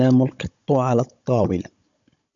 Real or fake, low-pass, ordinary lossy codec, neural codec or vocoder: fake; 7.2 kHz; MP3, 64 kbps; codec, 16 kHz, 16 kbps, FunCodec, trained on Chinese and English, 50 frames a second